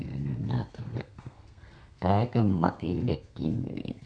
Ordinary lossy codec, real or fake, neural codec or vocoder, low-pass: none; fake; codec, 32 kHz, 1.9 kbps, SNAC; 9.9 kHz